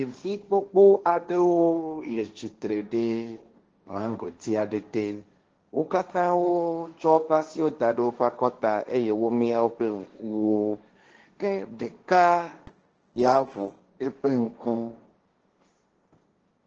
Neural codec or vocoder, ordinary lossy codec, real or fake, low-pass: codec, 16 kHz, 1.1 kbps, Voila-Tokenizer; Opus, 16 kbps; fake; 7.2 kHz